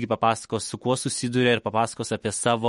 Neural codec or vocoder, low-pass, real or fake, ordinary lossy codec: autoencoder, 48 kHz, 128 numbers a frame, DAC-VAE, trained on Japanese speech; 19.8 kHz; fake; MP3, 48 kbps